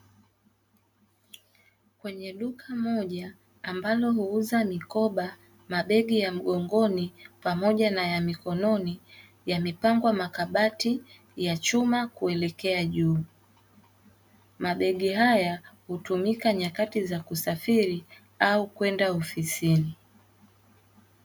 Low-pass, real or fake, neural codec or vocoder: 19.8 kHz; real; none